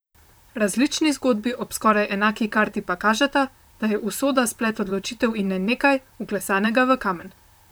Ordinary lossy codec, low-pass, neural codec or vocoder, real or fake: none; none; vocoder, 44.1 kHz, 128 mel bands, Pupu-Vocoder; fake